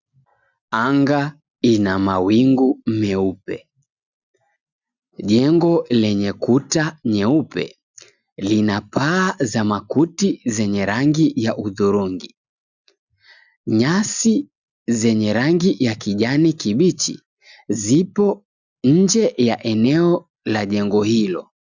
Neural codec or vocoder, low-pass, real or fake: none; 7.2 kHz; real